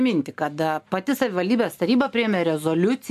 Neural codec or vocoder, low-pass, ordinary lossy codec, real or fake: none; 14.4 kHz; AAC, 96 kbps; real